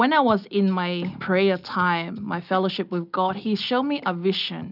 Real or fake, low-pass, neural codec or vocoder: real; 5.4 kHz; none